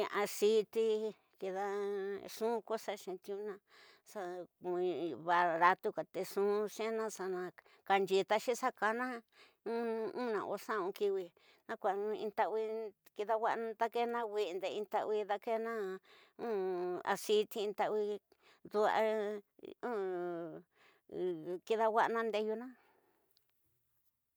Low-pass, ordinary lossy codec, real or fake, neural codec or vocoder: none; none; real; none